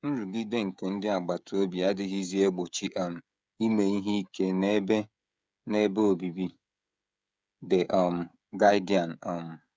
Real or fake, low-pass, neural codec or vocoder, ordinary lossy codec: fake; none; codec, 16 kHz, 16 kbps, FreqCodec, smaller model; none